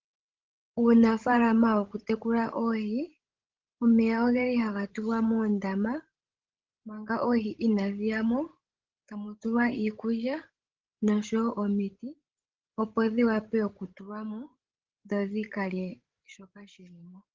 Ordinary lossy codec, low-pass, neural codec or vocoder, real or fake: Opus, 16 kbps; 7.2 kHz; codec, 16 kHz, 16 kbps, FreqCodec, larger model; fake